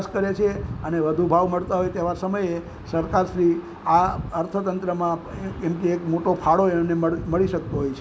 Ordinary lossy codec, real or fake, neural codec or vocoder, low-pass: none; real; none; none